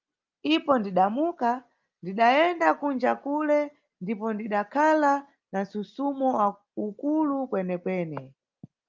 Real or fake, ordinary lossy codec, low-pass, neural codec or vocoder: real; Opus, 24 kbps; 7.2 kHz; none